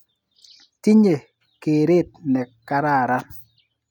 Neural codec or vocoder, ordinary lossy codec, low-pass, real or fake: none; none; 19.8 kHz; real